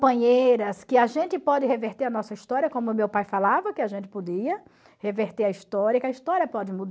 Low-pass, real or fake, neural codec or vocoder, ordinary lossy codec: none; real; none; none